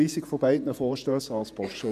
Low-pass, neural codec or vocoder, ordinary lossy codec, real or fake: 14.4 kHz; vocoder, 48 kHz, 128 mel bands, Vocos; none; fake